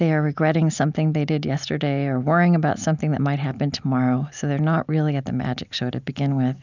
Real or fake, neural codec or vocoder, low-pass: real; none; 7.2 kHz